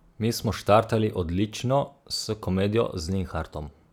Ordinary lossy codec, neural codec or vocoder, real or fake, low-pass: none; none; real; 19.8 kHz